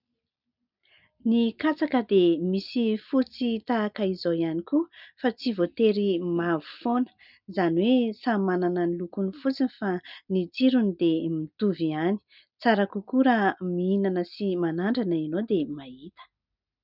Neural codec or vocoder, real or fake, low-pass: none; real; 5.4 kHz